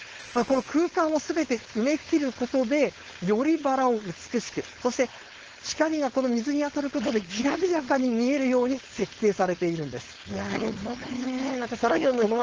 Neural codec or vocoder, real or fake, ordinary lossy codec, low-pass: codec, 16 kHz, 4.8 kbps, FACodec; fake; Opus, 16 kbps; 7.2 kHz